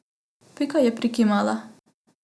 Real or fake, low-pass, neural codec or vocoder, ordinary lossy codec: real; none; none; none